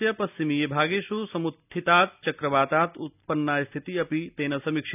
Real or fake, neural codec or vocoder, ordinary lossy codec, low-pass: real; none; none; 3.6 kHz